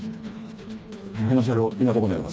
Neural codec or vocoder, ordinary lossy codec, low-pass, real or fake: codec, 16 kHz, 2 kbps, FreqCodec, smaller model; none; none; fake